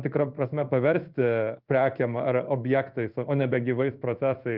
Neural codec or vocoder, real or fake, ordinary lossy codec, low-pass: codec, 16 kHz in and 24 kHz out, 1 kbps, XY-Tokenizer; fake; Opus, 32 kbps; 5.4 kHz